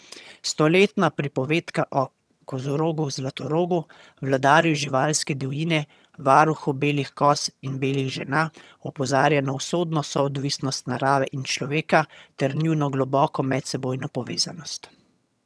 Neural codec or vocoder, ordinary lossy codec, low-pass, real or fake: vocoder, 22.05 kHz, 80 mel bands, HiFi-GAN; none; none; fake